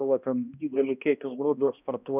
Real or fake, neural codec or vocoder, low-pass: fake; codec, 16 kHz, 1 kbps, X-Codec, HuBERT features, trained on balanced general audio; 3.6 kHz